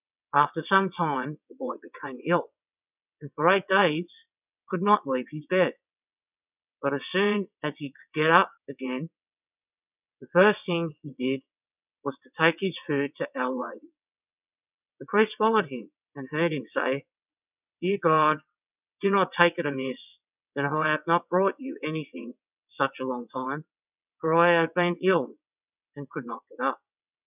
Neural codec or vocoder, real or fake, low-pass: vocoder, 22.05 kHz, 80 mel bands, WaveNeXt; fake; 3.6 kHz